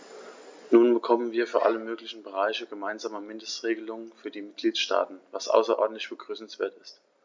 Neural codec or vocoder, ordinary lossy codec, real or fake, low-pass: none; MP3, 64 kbps; real; 7.2 kHz